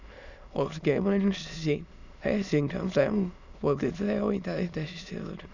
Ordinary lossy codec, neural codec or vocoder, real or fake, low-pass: none; autoencoder, 22.05 kHz, a latent of 192 numbers a frame, VITS, trained on many speakers; fake; 7.2 kHz